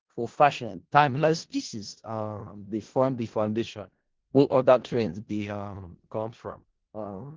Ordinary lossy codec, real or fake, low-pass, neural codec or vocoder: Opus, 16 kbps; fake; 7.2 kHz; codec, 16 kHz in and 24 kHz out, 0.4 kbps, LongCat-Audio-Codec, four codebook decoder